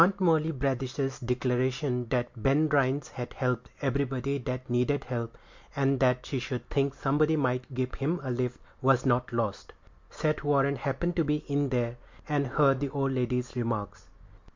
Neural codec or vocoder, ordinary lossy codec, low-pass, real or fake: none; MP3, 64 kbps; 7.2 kHz; real